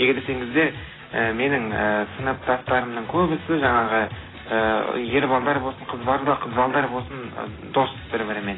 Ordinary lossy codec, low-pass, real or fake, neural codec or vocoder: AAC, 16 kbps; 7.2 kHz; real; none